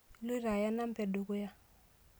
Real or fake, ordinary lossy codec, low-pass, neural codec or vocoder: real; none; none; none